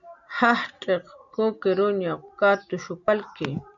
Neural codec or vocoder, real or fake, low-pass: none; real; 7.2 kHz